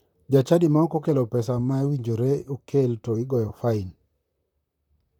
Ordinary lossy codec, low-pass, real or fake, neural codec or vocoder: none; 19.8 kHz; fake; vocoder, 44.1 kHz, 128 mel bands, Pupu-Vocoder